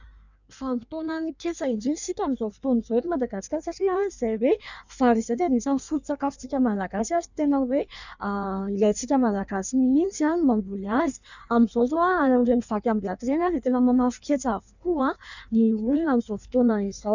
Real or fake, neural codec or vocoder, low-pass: fake; codec, 16 kHz in and 24 kHz out, 1.1 kbps, FireRedTTS-2 codec; 7.2 kHz